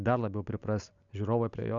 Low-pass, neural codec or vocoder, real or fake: 7.2 kHz; none; real